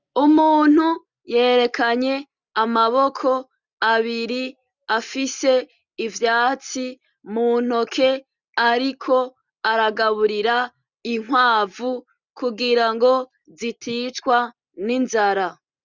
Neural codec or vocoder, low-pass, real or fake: none; 7.2 kHz; real